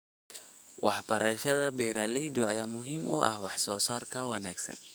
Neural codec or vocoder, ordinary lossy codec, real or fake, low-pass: codec, 44.1 kHz, 2.6 kbps, SNAC; none; fake; none